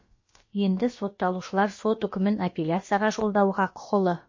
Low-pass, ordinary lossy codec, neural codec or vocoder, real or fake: 7.2 kHz; MP3, 32 kbps; codec, 16 kHz, about 1 kbps, DyCAST, with the encoder's durations; fake